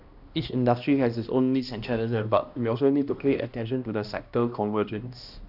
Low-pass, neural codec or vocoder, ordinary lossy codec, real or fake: 5.4 kHz; codec, 16 kHz, 1 kbps, X-Codec, HuBERT features, trained on balanced general audio; AAC, 48 kbps; fake